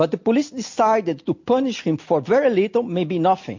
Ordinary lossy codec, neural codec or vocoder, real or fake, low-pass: MP3, 48 kbps; none; real; 7.2 kHz